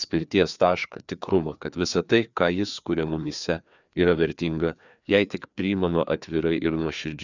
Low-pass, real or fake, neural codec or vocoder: 7.2 kHz; fake; codec, 16 kHz, 2 kbps, FreqCodec, larger model